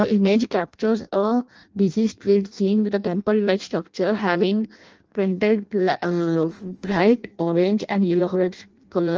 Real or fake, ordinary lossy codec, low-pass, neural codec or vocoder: fake; Opus, 24 kbps; 7.2 kHz; codec, 16 kHz in and 24 kHz out, 0.6 kbps, FireRedTTS-2 codec